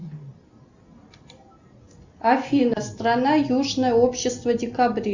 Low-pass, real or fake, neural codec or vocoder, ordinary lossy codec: 7.2 kHz; real; none; Opus, 64 kbps